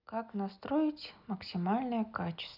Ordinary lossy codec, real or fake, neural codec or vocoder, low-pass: none; real; none; 5.4 kHz